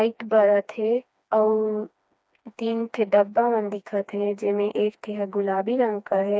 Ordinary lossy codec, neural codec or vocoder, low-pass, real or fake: none; codec, 16 kHz, 2 kbps, FreqCodec, smaller model; none; fake